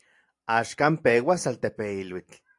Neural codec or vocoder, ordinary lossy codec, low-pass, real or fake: none; AAC, 64 kbps; 10.8 kHz; real